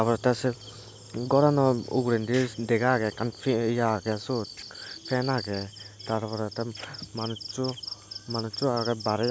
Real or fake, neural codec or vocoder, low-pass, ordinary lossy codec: real; none; none; none